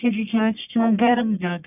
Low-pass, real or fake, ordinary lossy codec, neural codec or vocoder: 3.6 kHz; fake; none; codec, 44.1 kHz, 1.7 kbps, Pupu-Codec